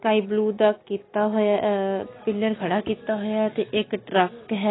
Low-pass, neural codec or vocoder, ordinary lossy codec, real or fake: 7.2 kHz; none; AAC, 16 kbps; real